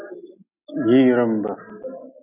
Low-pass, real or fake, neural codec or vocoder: 3.6 kHz; real; none